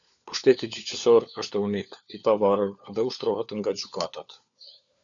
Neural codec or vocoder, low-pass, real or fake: codec, 16 kHz, 4 kbps, FunCodec, trained on LibriTTS, 50 frames a second; 7.2 kHz; fake